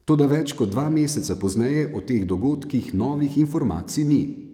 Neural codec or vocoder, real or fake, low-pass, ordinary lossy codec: codec, 44.1 kHz, 7.8 kbps, DAC; fake; 19.8 kHz; none